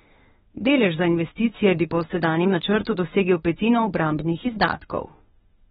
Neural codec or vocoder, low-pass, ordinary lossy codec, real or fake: autoencoder, 48 kHz, 32 numbers a frame, DAC-VAE, trained on Japanese speech; 19.8 kHz; AAC, 16 kbps; fake